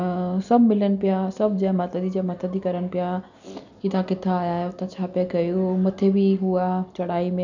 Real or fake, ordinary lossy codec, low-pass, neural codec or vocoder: real; none; 7.2 kHz; none